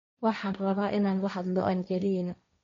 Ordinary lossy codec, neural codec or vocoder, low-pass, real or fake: MP3, 48 kbps; codec, 16 kHz, 1.1 kbps, Voila-Tokenizer; 7.2 kHz; fake